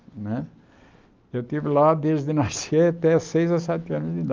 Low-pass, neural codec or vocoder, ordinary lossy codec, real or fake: 7.2 kHz; none; Opus, 24 kbps; real